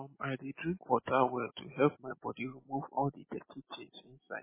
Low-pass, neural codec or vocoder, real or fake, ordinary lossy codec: 3.6 kHz; none; real; MP3, 16 kbps